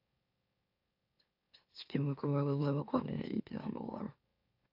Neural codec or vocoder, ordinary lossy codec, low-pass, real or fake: autoencoder, 44.1 kHz, a latent of 192 numbers a frame, MeloTTS; AAC, 48 kbps; 5.4 kHz; fake